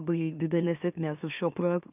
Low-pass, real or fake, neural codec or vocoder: 3.6 kHz; fake; autoencoder, 44.1 kHz, a latent of 192 numbers a frame, MeloTTS